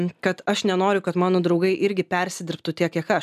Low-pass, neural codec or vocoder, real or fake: 14.4 kHz; none; real